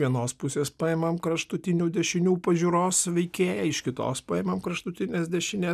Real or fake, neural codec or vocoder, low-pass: real; none; 14.4 kHz